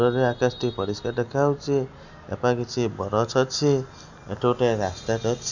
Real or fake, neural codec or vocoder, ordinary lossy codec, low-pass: real; none; none; 7.2 kHz